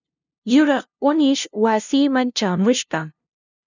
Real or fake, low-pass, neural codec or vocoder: fake; 7.2 kHz; codec, 16 kHz, 0.5 kbps, FunCodec, trained on LibriTTS, 25 frames a second